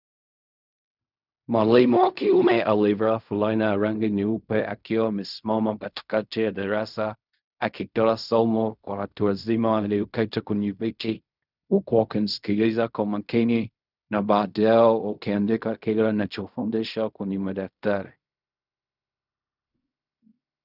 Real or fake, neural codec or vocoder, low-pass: fake; codec, 16 kHz in and 24 kHz out, 0.4 kbps, LongCat-Audio-Codec, fine tuned four codebook decoder; 5.4 kHz